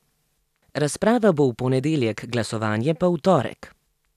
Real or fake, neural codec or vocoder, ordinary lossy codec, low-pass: real; none; none; 14.4 kHz